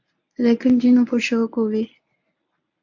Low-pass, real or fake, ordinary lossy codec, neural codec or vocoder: 7.2 kHz; fake; AAC, 48 kbps; codec, 24 kHz, 0.9 kbps, WavTokenizer, medium speech release version 1